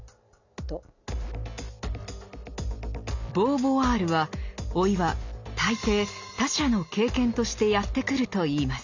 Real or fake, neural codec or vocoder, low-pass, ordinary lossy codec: real; none; 7.2 kHz; none